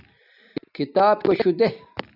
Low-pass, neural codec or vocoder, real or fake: 5.4 kHz; none; real